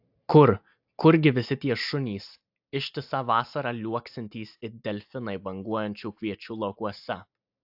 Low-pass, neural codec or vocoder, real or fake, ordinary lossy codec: 5.4 kHz; none; real; AAC, 48 kbps